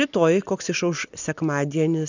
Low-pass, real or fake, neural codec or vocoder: 7.2 kHz; real; none